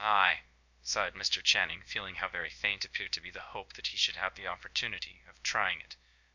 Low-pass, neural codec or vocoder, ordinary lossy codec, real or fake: 7.2 kHz; codec, 16 kHz, about 1 kbps, DyCAST, with the encoder's durations; MP3, 48 kbps; fake